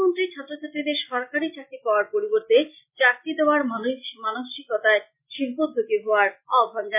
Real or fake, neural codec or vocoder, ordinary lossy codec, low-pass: real; none; MP3, 32 kbps; 3.6 kHz